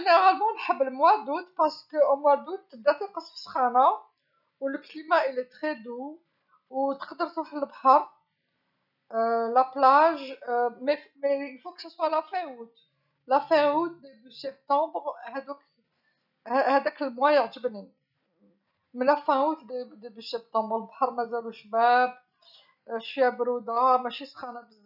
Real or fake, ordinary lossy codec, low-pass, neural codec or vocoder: real; none; 5.4 kHz; none